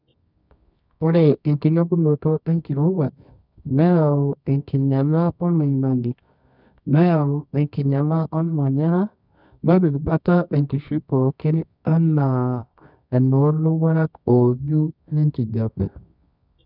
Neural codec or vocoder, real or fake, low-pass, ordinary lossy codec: codec, 24 kHz, 0.9 kbps, WavTokenizer, medium music audio release; fake; 5.4 kHz; none